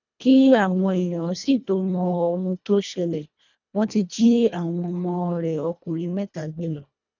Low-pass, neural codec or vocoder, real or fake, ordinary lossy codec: 7.2 kHz; codec, 24 kHz, 1.5 kbps, HILCodec; fake; none